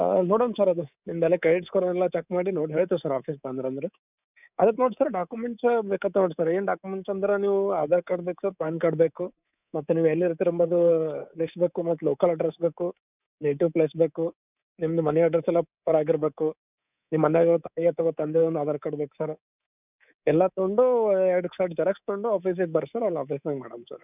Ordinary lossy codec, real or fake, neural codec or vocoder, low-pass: none; fake; codec, 24 kHz, 3.1 kbps, DualCodec; 3.6 kHz